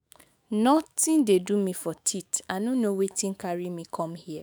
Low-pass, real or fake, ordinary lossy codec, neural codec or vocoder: none; fake; none; autoencoder, 48 kHz, 128 numbers a frame, DAC-VAE, trained on Japanese speech